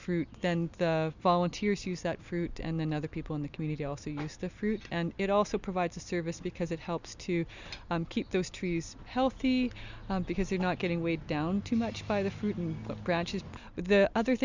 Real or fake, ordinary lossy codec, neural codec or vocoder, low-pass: real; Opus, 64 kbps; none; 7.2 kHz